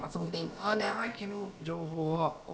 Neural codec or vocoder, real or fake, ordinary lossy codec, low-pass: codec, 16 kHz, about 1 kbps, DyCAST, with the encoder's durations; fake; none; none